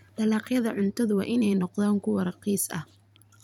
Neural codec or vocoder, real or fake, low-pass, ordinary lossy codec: vocoder, 44.1 kHz, 128 mel bands every 512 samples, BigVGAN v2; fake; 19.8 kHz; none